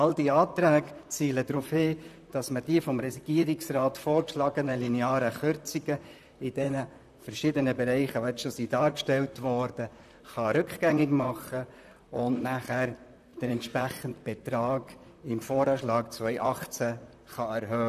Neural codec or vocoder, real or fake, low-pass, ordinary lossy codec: vocoder, 44.1 kHz, 128 mel bands, Pupu-Vocoder; fake; 14.4 kHz; none